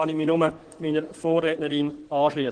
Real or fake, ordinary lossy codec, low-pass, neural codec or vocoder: fake; Opus, 16 kbps; 9.9 kHz; codec, 16 kHz in and 24 kHz out, 2.2 kbps, FireRedTTS-2 codec